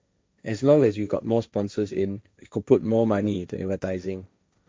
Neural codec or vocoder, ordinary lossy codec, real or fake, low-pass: codec, 16 kHz, 1.1 kbps, Voila-Tokenizer; none; fake; none